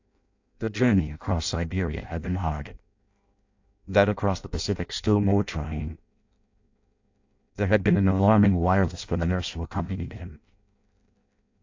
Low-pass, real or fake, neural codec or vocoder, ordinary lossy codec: 7.2 kHz; fake; codec, 16 kHz in and 24 kHz out, 0.6 kbps, FireRedTTS-2 codec; AAC, 48 kbps